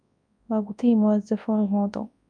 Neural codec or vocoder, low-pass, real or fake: codec, 24 kHz, 0.9 kbps, WavTokenizer, large speech release; 9.9 kHz; fake